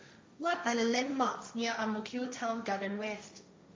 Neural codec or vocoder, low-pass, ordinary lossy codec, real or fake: codec, 16 kHz, 1.1 kbps, Voila-Tokenizer; none; none; fake